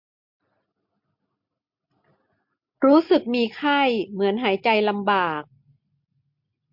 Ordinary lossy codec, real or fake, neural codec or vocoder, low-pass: MP3, 48 kbps; real; none; 5.4 kHz